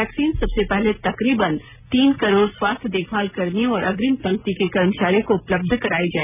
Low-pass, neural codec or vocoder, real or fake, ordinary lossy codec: 3.6 kHz; none; real; none